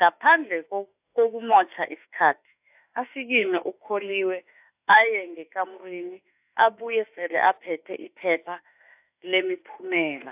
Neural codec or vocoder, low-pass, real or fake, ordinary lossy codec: autoencoder, 48 kHz, 32 numbers a frame, DAC-VAE, trained on Japanese speech; 3.6 kHz; fake; AAC, 32 kbps